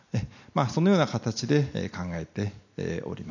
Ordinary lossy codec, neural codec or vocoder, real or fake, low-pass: none; none; real; 7.2 kHz